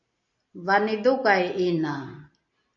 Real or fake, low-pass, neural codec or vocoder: real; 7.2 kHz; none